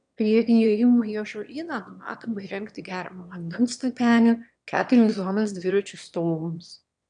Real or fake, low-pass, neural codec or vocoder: fake; 9.9 kHz; autoencoder, 22.05 kHz, a latent of 192 numbers a frame, VITS, trained on one speaker